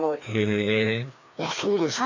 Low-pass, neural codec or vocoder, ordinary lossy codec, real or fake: 7.2 kHz; codec, 16 kHz, 2 kbps, FreqCodec, larger model; none; fake